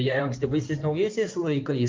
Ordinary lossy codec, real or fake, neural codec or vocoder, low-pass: Opus, 32 kbps; fake; codec, 16 kHz in and 24 kHz out, 2.2 kbps, FireRedTTS-2 codec; 7.2 kHz